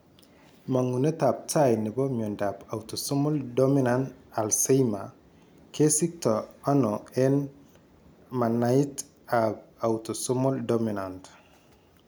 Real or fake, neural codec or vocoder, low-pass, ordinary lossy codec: real; none; none; none